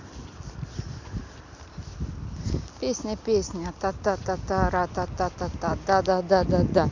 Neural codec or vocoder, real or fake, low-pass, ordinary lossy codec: none; real; 7.2 kHz; Opus, 64 kbps